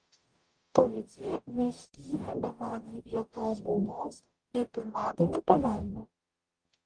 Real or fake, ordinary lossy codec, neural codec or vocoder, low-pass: fake; Opus, 32 kbps; codec, 44.1 kHz, 0.9 kbps, DAC; 9.9 kHz